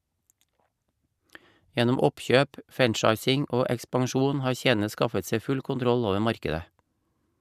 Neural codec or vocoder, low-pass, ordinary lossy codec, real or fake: none; 14.4 kHz; none; real